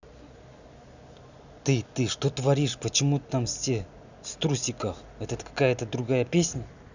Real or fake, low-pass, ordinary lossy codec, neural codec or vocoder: real; 7.2 kHz; none; none